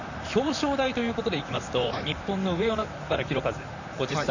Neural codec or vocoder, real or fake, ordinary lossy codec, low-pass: vocoder, 44.1 kHz, 128 mel bands, Pupu-Vocoder; fake; none; 7.2 kHz